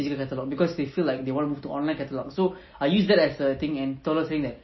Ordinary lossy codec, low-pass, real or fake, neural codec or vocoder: MP3, 24 kbps; 7.2 kHz; real; none